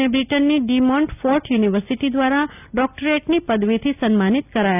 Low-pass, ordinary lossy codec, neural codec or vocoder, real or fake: 3.6 kHz; none; none; real